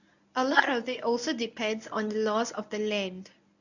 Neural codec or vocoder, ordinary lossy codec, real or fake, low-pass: codec, 24 kHz, 0.9 kbps, WavTokenizer, medium speech release version 1; none; fake; 7.2 kHz